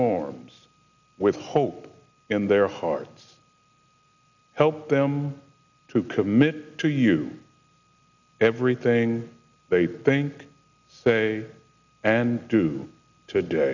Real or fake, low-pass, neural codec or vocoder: real; 7.2 kHz; none